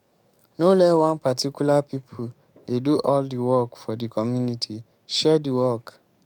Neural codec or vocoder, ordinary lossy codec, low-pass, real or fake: codec, 44.1 kHz, 7.8 kbps, DAC; none; 19.8 kHz; fake